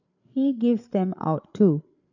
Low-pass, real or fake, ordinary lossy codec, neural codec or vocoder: 7.2 kHz; fake; none; codec, 16 kHz, 8 kbps, FreqCodec, larger model